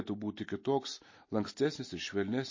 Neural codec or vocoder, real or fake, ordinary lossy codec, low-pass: none; real; MP3, 32 kbps; 7.2 kHz